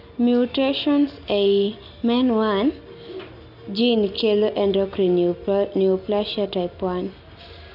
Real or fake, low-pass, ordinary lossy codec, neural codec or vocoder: real; 5.4 kHz; none; none